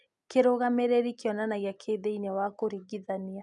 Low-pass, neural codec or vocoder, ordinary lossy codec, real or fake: 10.8 kHz; none; none; real